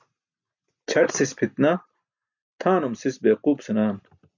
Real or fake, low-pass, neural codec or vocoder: real; 7.2 kHz; none